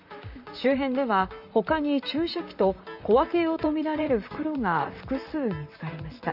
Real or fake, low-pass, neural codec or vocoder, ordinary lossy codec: fake; 5.4 kHz; vocoder, 44.1 kHz, 128 mel bands, Pupu-Vocoder; none